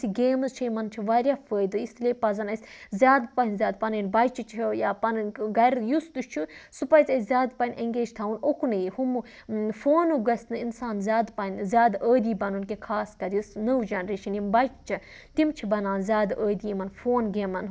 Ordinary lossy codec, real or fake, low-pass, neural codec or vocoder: none; real; none; none